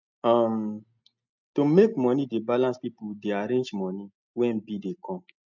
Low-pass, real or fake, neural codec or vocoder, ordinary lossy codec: 7.2 kHz; real; none; none